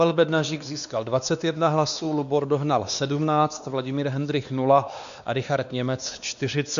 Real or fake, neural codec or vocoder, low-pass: fake; codec, 16 kHz, 2 kbps, X-Codec, WavLM features, trained on Multilingual LibriSpeech; 7.2 kHz